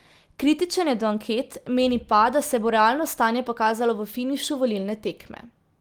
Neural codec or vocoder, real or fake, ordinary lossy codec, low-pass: none; real; Opus, 24 kbps; 19.8 kHz